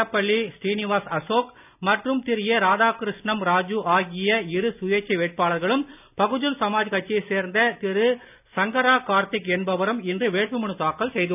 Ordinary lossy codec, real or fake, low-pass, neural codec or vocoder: none; real; 3.6 kHz; none